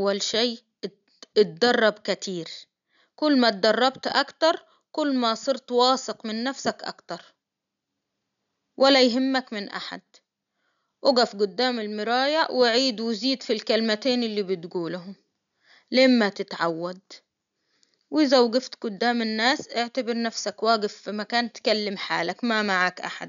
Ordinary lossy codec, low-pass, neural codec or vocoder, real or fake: none; 7.2 kHz; none; real